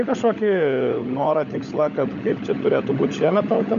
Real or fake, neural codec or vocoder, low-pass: fake; codec, 16 kHz, 16 kbps, FunCodec, trained on LibriTTS, 50 frames a second; 7.2 kHz